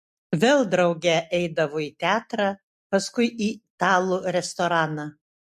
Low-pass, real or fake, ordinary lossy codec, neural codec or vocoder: 14.4 kHz; real; MP3, 64 kbps; none